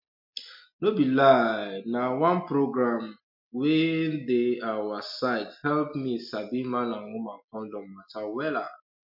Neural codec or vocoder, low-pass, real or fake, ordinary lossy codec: none; 5.4 kHz; real; MP3, 48 kbps